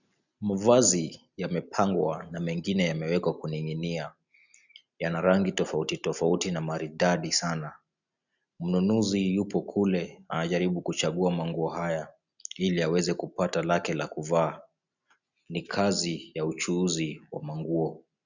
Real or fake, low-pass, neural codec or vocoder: real; 7.2 kHz; none